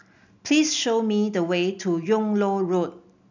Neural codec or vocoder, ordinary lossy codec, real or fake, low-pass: none; none; real; 7.2 kHz